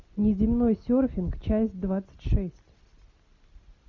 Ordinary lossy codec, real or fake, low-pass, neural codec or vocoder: MP3, 48 kbps; real; 7.2 kHz; none